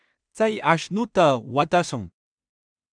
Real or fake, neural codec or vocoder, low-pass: fake; codec, 16 kHz in and 24 kHz out, 0.4 kbps, LongCat-Audio-Codec, two codebook decoder; 9.9 kHz